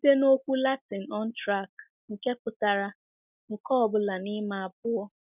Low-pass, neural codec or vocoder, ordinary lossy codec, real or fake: 3.6 kHz; none; none; real